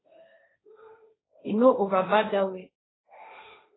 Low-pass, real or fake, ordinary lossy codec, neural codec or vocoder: 7.2 kHz; fake; AAC, 16 kbps; codec, 16 kHz, 1.1 kbps, Voila-Tokenizer